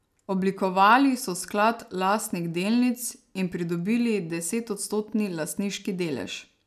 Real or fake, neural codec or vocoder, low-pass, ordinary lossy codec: real; none; 14.4 kHz; none